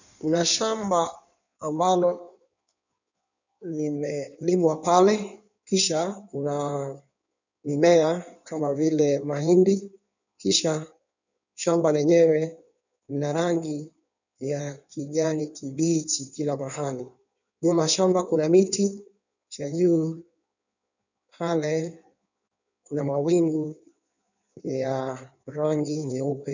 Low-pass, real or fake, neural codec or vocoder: 7.2 kHz; fake; codec, 16 kHz in and 24 kHz out, 1.1 kbps, FireRedTTS-2 codec